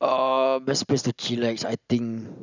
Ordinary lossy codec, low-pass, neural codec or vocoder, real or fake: none; 7.2 kHz; none; real